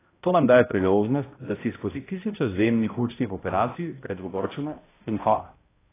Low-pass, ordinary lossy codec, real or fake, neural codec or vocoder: 3.6 kHz; AAC, 16 kbps; fake; codec, 16 kHz, 0.5 kbps, X-Codec, HuBERT features, trained on balanced general audio